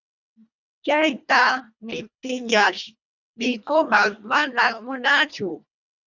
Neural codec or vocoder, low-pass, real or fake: codec, 24 kHz, 1.5 kbps, HILCodec; 7.2 kHz; fake